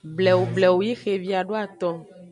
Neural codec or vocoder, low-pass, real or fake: none; 10.8 kHz; real